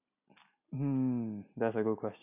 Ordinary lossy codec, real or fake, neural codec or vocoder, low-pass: none; real; none; 3.6 kHz